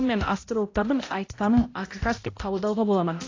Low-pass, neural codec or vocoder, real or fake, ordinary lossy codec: 7.2 kHz; codec, 16 kHz, 0.5 kbps, X-Codec, HuBERT features, trained on balanced general audio; fake; AAC, 32 kbps